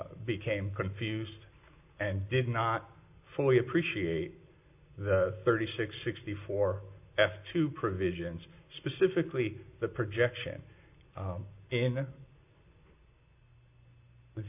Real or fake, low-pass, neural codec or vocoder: real; 3.6 kHz; none